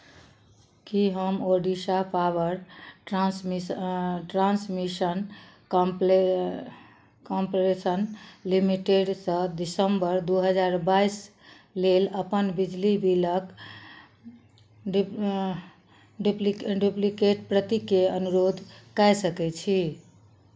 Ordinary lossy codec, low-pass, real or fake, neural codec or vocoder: none; none; real; none